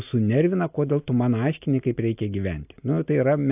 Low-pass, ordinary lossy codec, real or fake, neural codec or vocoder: 3.6 kHz; AAC, 32 kbps; real; none